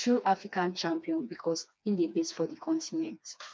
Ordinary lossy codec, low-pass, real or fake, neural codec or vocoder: none; none; fake; codec, 16 kHz, 2 kbps, FreqCodec, smaller model